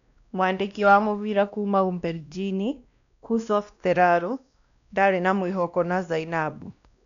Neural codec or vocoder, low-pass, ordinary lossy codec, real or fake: codec, 16 kHz, 1 kbps, X-Codec, WavLM features, trained on Multilingual LibriSpeech; 7.2 kHz; none; fake